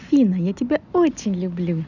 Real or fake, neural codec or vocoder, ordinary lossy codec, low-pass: real; none; none; 7.2 kHz